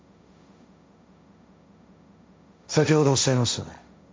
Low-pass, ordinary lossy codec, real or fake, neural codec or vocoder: none; none; fake; codec, 16 kHz, 1.1 kbps, Voila-Tokenizer